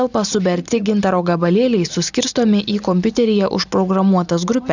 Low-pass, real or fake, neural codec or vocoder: 7.2 kHz; real; none